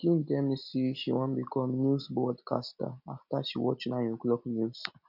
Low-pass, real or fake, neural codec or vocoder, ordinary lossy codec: 5.4 kHz; real; none; none